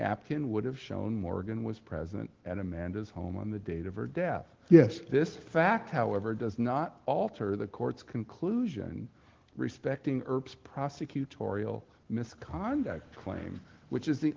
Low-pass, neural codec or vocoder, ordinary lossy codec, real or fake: 7.2 kHz; none; Opus, 16 kbps; real